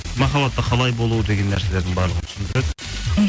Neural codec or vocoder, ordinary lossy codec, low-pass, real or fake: none; none; none; real